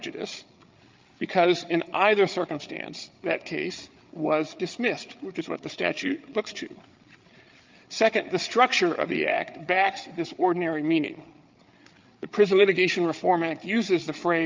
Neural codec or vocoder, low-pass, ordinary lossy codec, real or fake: codec, 16 kHz, 8 kbps, FreqCodec, larger model; 7.2 kHz; Opus, 24 kbps; fake